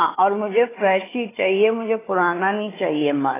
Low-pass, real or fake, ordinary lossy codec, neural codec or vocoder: 3.6 kHz; fake; AAC, 16 kbps; codec, 16 kHz, 4 kbps, FunCodec, trained on Chinese and English, 50 frames a second